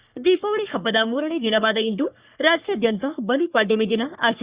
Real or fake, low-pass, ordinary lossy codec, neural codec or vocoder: fake; 3.6 kHz; Opus, 64 kbps; codec, 44.1 kHz, 3.4 kbps, Pupu-Codec